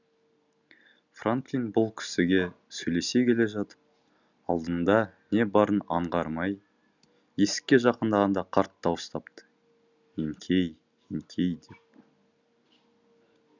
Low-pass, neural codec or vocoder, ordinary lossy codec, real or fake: 7.2 kHz; none; none; real